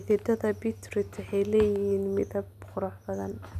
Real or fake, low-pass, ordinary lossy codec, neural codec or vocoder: real; 14.4 kHz; none; none